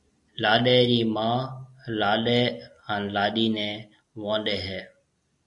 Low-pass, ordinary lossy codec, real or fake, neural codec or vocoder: 10.8 kHz; AAC, 64 kbps; real; none